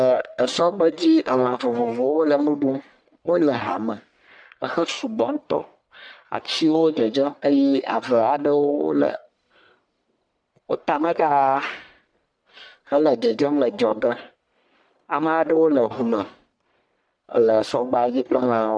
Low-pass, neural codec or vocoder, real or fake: 9.9 kHz; codec, 44.1 kHz, 1.7 kbps, Pupu-Codec; fake